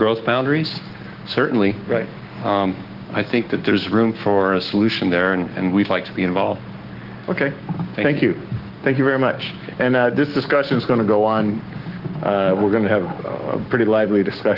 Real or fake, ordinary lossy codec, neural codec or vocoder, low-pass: fake; Opus, 16 kbps; codec, 16 kHz, 6 kbps, DAC; 5.4 kHz